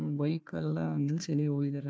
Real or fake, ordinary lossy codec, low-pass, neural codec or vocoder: fake; none; none; codec, 16 kHz, 1 kbps, FunCodec, trained on Chinese and English, 50 frames a second